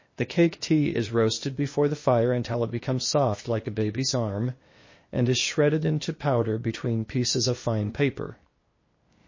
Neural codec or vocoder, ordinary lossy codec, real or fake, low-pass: codec, 16 kHz, 0.8 kbps, ZipCodec; MP3, 32 kbps; fake; 7.2 kHz